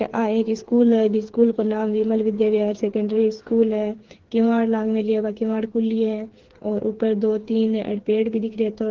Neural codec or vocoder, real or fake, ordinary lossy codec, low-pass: codec, 16 kHz, 4 kbps, FreqCodec, smaller model; fake; Opus, 16 kbps; 7.2 kHz